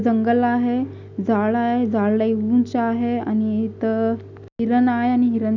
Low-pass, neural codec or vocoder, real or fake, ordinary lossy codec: 7.2 kHz; none; real; none